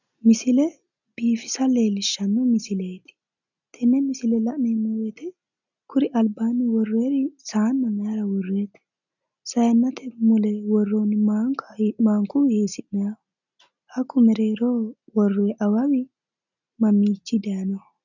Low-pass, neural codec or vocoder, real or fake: 7.2 kHz; none; real